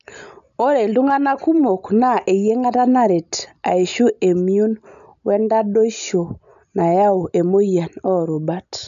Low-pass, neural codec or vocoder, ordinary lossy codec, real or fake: 7.2 kHz; none; none; real